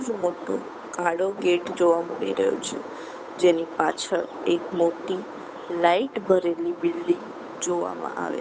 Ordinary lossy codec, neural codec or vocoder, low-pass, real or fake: none; codec, 16 kHz, 8 kbps, FunCodec, trained on Chinese and English, 25 frames a second; none; fake